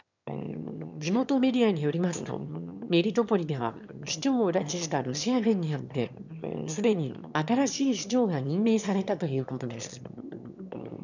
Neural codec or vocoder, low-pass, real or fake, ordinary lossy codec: autoencoder, 22.05 kHz, a latent of 192 numbers a frame, VITS, trained on one speaker; 7.2 kHz; fake; none